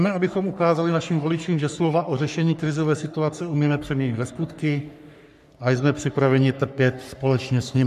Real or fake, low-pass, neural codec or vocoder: fake; 14.4 kHz; codec, 44.1 kHz, 3.4 kbps, Pupu-Codec